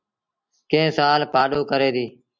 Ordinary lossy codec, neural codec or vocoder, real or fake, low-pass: MP3, 64 kbps; none; real; 7.2 kHz